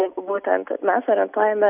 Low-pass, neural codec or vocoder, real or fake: 3.6 kHz; none; real